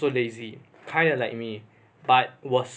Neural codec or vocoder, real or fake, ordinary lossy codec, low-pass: none; real; none; none